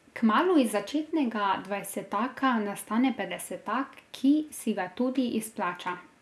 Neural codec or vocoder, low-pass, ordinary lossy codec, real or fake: none; none; none; real